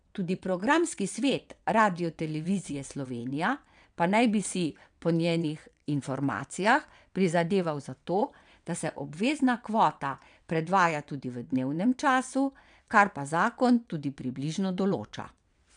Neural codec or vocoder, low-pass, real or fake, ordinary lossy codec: vocoder, 22.05 kHz, 80 mel bands, WaveNeXt; 9.9 kHz; fake; none